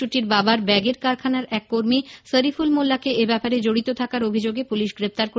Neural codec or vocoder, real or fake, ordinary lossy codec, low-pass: none; real; none; none